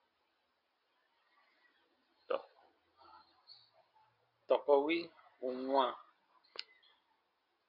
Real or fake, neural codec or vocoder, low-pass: real; none; 5.4 kHz